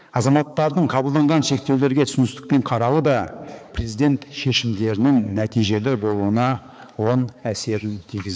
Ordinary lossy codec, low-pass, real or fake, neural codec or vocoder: none; none; fake; codec, 16 kHz, 4 kbps, X-Codec, HuBERT features, trained on balanced general audio